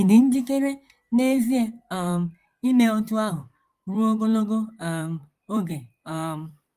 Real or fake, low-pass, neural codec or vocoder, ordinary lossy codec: fake; 14.4 kHz; vocoder, 44.1 kHz, 128 mel bands, Pupu-Vocoder; Opus, 32 kbps